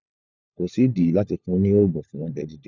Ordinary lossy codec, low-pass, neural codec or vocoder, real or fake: none; 7.2 kHz; codec, 16 kHz, 4 kbps, FunCodec, trained on LibriTTS, 50 frames a second; fake